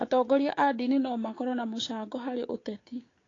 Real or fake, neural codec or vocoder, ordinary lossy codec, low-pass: fake; codec, 16 kHz, 6 kbps, DAC; AAC, 32 kbps; 7.2 kHz